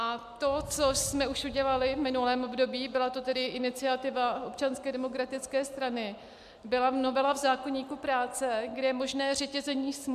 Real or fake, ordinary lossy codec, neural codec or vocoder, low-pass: real; MP3, 96 kbps; none; 14.4 kHz